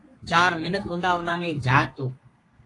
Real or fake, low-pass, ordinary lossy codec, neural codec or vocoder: fake; 10.8 kHz; AAC, 48 kbps; codec, 32 kHz, 1.9 kbps, SNAC